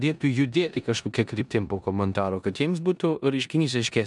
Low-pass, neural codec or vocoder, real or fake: 10.8 kHz; codec, 16 kHz in and 24 kHz out, 0.9 kbps, LongCat-Audio-Codec, four codebook decoder; fake